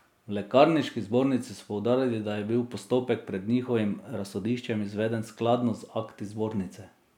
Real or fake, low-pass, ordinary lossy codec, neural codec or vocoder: real; 19.8 kHz; none; none